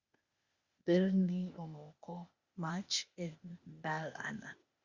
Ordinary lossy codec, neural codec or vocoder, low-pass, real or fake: Opus, 64 kbps; codec, 16 kHz, 0.8 kbps, ZipCodec; 7.2 kHz; fake